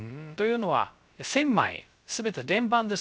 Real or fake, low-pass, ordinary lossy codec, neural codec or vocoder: fake; none; none; codec, 16 kHz, 0.3 kbps, FocalCodec